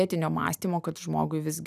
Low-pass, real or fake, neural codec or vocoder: 14.4 kHz; real; none